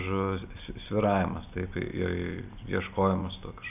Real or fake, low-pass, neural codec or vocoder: real; 3.6 kHz; none